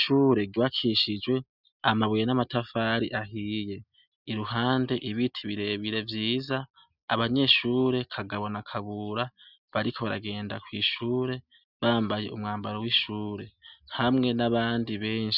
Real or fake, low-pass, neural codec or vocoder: real; 5.4 kHz; none